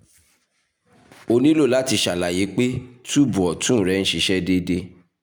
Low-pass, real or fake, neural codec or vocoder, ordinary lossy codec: none; real; none; none